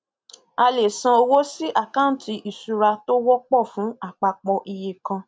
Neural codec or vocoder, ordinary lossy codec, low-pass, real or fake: none; none; none; real